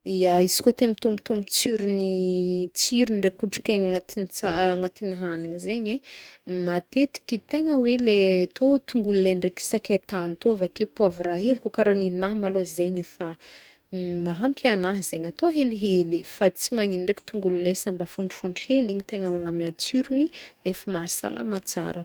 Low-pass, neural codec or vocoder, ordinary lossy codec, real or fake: none; codec, 44.1 kHz, 2.6 kbps, DAC; none; fake